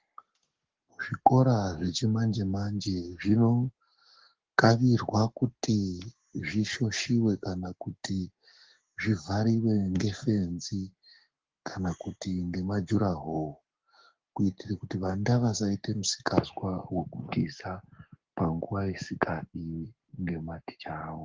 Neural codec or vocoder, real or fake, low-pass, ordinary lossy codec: codec, 44.1 kHz, 7.8 kbps, Pupu-Codec; fake; 7.2 kHz; Opus, 16 kbps